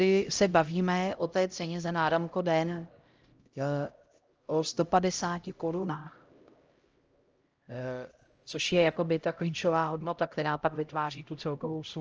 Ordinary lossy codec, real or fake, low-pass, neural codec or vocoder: Opus, 24 kbps; fake; 7.2 kHz; codec, 16 kHz, 0.5 kbps, X-Codec, HuBERT features, trained on LibriSpeech